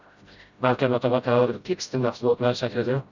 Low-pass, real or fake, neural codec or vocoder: 7.2 kHz; fake; codec, 16 kHz, 0.5 kbps, FreqCodec, smaller model